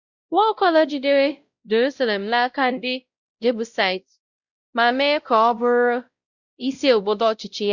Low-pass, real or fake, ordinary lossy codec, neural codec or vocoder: 7.2 kHz; fake; Opus, 64 kbps; codec, 16 kHz, 0.5 kbps, X-Codec, WavLM features, trained on Multilingual LibriSpeech